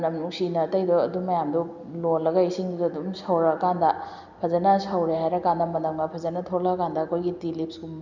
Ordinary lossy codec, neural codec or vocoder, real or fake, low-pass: none; none; real; 7.2 kHz